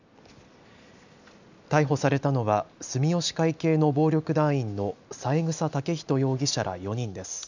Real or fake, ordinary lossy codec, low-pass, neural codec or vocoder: real; none; 7.2 kHz; none